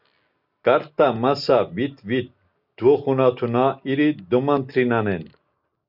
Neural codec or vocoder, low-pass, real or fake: none; 5.4 kHz; real